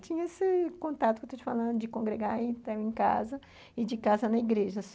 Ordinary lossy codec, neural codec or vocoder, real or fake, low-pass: none; none; real; none